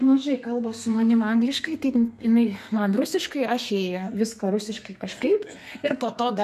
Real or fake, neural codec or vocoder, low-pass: fake; codec, 32 kHz, 1.9 kbps, SNAC; 14.4 kHz